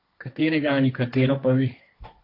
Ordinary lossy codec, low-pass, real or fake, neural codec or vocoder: AAC, 32 kbps; 5.4 kHz; fake; codec, 16 kHz, 1.1 kbps, Voila-Tokenizer